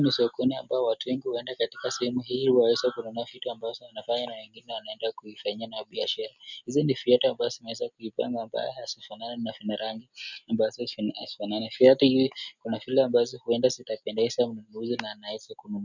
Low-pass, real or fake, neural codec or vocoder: 7.2 kHz; real; none